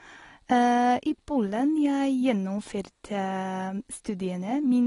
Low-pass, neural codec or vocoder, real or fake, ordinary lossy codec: 10.8 kHz; none; real; AAC, 32 kbps